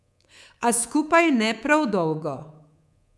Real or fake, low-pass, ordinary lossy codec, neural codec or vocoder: fake; none; none; codec, 24 kHz, 3.1 kbps, DualCodec